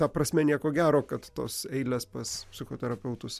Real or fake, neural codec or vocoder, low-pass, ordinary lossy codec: real; none; 14.4 kHz; MP3, 96 kbps